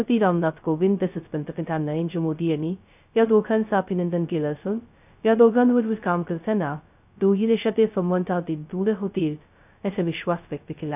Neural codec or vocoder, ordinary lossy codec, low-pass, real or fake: codec, 16 kHz, 0.2 kbps, FocalCodec; none; 3.6 kHz; fake